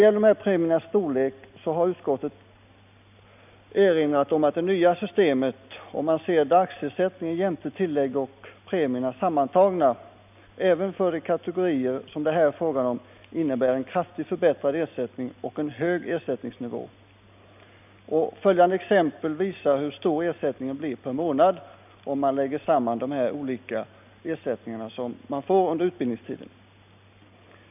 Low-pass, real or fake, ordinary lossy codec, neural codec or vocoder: 3.6 kHz; real; none; none